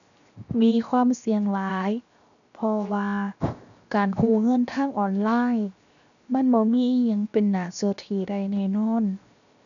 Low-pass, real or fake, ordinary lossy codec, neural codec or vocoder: 7.2 kHz; fake; none; codec, 16 kHz, 0.7 kbps, FocalCodec